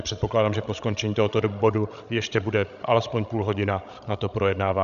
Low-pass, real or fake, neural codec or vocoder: 7.2 kHz; fake; codec, 16 kHz, 16 kbps, FreqCodec, larger model